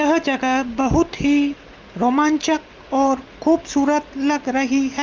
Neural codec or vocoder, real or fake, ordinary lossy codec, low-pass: none; real; Opus, 32 kbps; 7.2 kHz